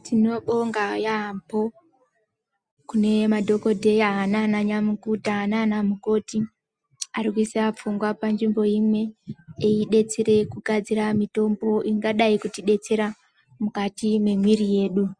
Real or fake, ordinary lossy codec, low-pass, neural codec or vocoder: real; Opus, 64 kbps; 9.9 kHz; none